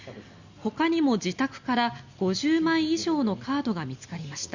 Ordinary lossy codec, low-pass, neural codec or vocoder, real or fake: Opus, 64 kbps; 7.2 kHz; none; real